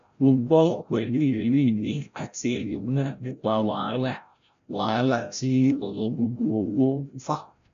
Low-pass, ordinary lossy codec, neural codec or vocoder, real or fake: 7.2 kHz; MP3, 64 kbps; codec, 16 kHz, 0.5 kbps, FreqCodec, larger model; fake